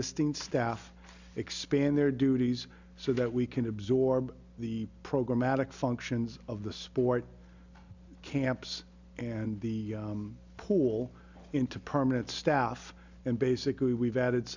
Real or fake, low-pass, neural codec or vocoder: real; 7.2 kHz; none